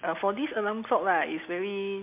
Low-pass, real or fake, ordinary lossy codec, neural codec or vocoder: 3.6 kHz; real; MP3, 32 kbps; none